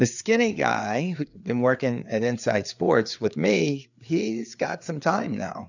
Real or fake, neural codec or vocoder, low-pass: fake; codec, 16 kHz, 4 kbps, FreqCodec, larger model; 7.2 kHz